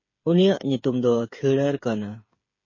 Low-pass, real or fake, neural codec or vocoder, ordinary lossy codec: 7.2 kHz; fake; codec, 16 kHz, 8 kbps, FreqCodec, smaller model; MP3, 32 kbps